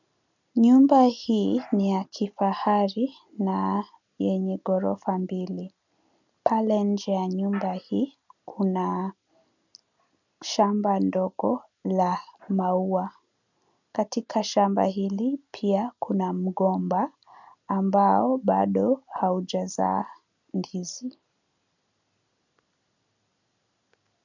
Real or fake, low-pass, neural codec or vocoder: real; 7.2 kHz; none